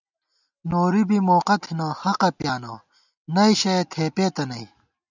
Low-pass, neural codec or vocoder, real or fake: 7.2 kHz; none; real